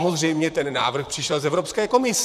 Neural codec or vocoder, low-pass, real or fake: vocoder, 44.1 kHz, 128 mel bands, Pupu-Vocoder; 14.4 kHz; fake